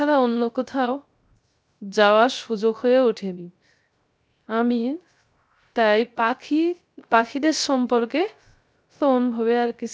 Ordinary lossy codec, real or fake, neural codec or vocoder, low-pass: none; fake; codec, 16 kHz, 0.3 kbps, FocalCodec; none